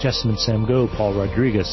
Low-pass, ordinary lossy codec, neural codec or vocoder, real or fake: 7.2 kHz; MP3, 24 kbps; none; real